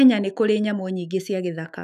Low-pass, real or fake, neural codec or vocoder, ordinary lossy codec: 14.4 kHz; fake; autoencoder, 48 kHz, 128 numbers a frame, DAC-VAE, trained on Japanese speech; none